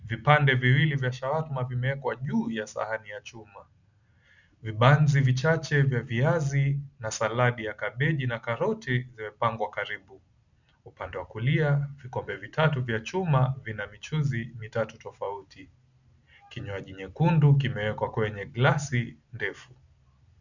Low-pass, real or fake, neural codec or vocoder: 7.2 kHz; real; none